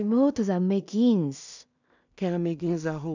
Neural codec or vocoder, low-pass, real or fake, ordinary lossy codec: codec, 16 kHz in and 24 kHz out, 0.4 kbps, LongCat-Audio-Codec, two codebook decoder; 7.2 kHz; fake; none